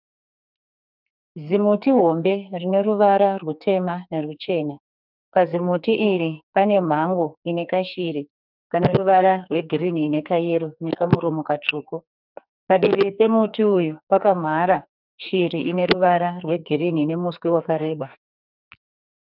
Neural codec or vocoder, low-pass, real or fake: codec, 44.1 kHz, 2.6 kbps, SNAC; 5.4 kHz; fake